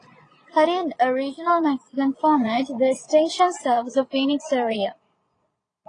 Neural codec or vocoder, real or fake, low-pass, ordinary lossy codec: vocoder, 22.05 kHz, 80 mel bands, Vocos; fake; 9.9 kHz; AAC, 32 kbps